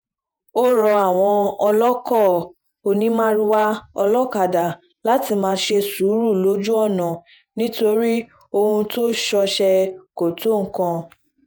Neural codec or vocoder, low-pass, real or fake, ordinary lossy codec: vocoder, 48 kHz, 128 mel bands, Vocos; none; fake; none